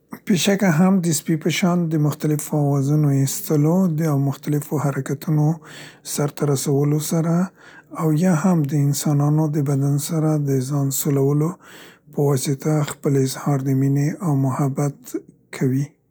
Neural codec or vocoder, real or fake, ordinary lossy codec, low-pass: none; real; none; none